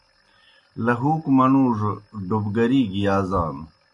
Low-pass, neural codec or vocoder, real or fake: 10.8 kHz; none; real